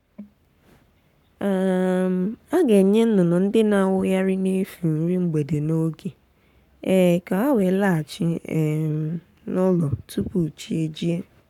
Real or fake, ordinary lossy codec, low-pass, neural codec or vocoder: fake; none; 19.8 kHz; codec, 44.1 kHz, 7.8 kbps, Pupu-Codec